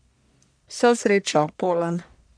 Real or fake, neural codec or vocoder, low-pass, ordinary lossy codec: fake; codec, 44.1 kHz, 1.7 kbps, Pupu-Codec; 9.9 kHz; none